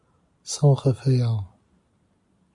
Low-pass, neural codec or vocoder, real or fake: 10.8 kHz; none; real